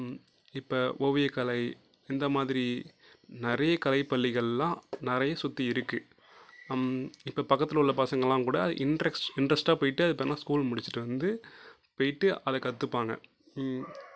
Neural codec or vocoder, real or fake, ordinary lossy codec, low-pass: none; real; none; none